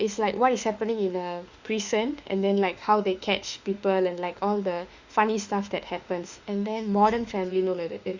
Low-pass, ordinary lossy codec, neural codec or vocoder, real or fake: 7.2 kHz; Opus, 64 kbps; autoencoder, 48 kHz, 32 numbers a frame, DAC-VAE, trained on Japanese speech; fake